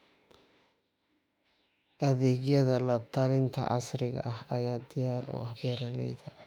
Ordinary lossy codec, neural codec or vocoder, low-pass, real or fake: none; autoencoder, 48 kHz, 32 numbers a frame, DAC-VAE, trained on Japanese speech; 19.8 kHz; fake